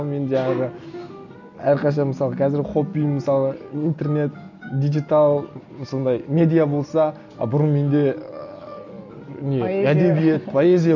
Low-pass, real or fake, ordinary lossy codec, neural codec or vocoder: 7.2 kHz; real; none; none